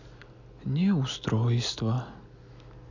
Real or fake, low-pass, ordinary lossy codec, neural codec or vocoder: real; 7.2 kHz; none; none